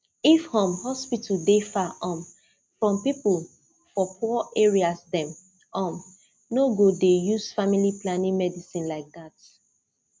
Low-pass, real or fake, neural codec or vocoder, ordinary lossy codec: none; real; none; none